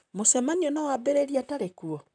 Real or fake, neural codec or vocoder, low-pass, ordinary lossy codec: fake; vocoder, 44.1 kHz, 128 mel bands, Pupu-Vocoder; 9.9 kHz; none